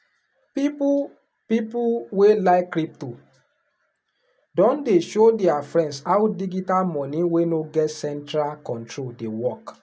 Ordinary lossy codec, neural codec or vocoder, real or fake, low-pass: none; none; real; none